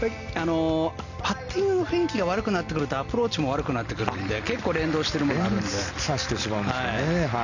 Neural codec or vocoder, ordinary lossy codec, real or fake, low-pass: none; none; real; 7.2 kHz